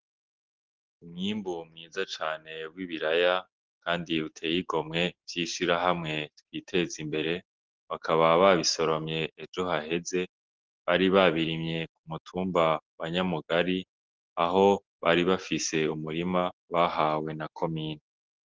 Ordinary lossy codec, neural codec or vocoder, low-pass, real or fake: Opus, 16 kbps; none; 7.2 kHz; real